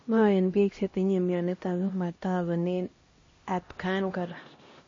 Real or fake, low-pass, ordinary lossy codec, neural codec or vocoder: fake; 7.2 kHz; MP3, 32 kbps; codec, 16 kHz, 1 kbps, X-Codec, HuBERT features, trained on LibriSpeech